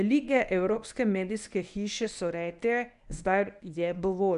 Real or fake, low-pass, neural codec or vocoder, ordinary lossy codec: fake; 10.8 kHz; codec, 24 kHz, 0.9 kbps, WavTokenizer, medium speech release version 1; none